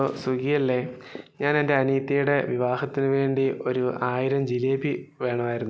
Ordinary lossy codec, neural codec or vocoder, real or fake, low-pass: none; none; real; none